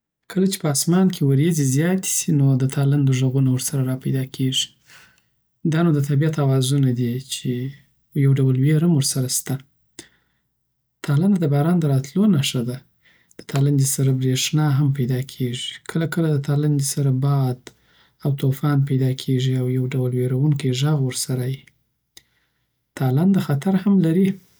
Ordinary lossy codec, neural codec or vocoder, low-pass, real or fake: none; none; none; real